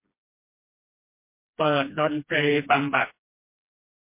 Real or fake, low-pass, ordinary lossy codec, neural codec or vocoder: fake; 3.6 kHz; MP3, 24 kbps; codec, 16 kHz, 1 kbps, FreqCodec, smaller model